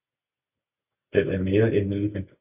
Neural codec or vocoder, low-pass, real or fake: none; 3.6 kHz; real